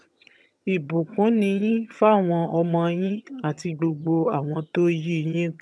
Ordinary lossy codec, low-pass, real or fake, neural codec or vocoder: none; none; fake; vocoder, 22.05 kHz, 80 mel bands, HiFi-GAN